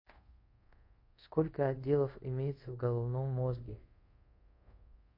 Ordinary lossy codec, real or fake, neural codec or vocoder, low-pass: MP3, 32 kbps; fake; codec, 24 kHz, 0.5 kbps, DualCodec; 5.4 kHz